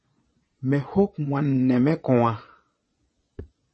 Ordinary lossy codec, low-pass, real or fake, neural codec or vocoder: MP3, 32 kbps; 9.9 kHz; fake; vocoder, 22.05 kHz, 80 mel bands, Vocos